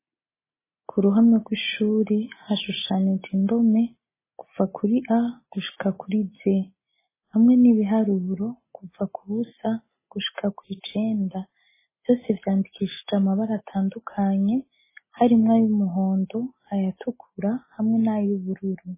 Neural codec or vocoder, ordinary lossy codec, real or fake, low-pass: none; MP3, 16 kbps; real; 3.6 kHz